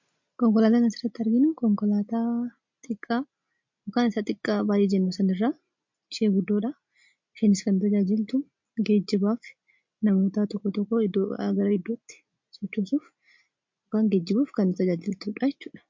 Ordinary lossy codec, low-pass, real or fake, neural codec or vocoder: MP3, 48 kbps; 7.2 kHz; real; none